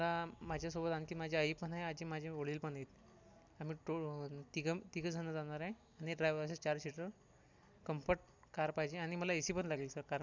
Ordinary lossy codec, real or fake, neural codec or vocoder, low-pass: none; real; none; 7.2 kHz